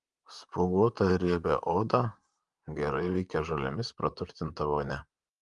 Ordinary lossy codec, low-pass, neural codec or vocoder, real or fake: Opus, 32 kbps; 10.8 kHz; vocoder, 44.1 kHz, 128 mel bands, Pupu-Vocoder; fake